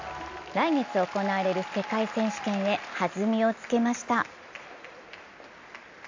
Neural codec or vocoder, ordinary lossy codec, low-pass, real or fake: none; AAC, 48 kbps; 7.2 kHz; real